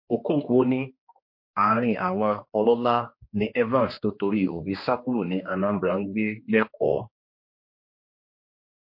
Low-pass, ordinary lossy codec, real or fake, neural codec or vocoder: 5.4 kHz; MP3, 32 kbps; fake; codec, 16 kHz, 2 kbps, X-Codec, HuBERT features, trained on general audio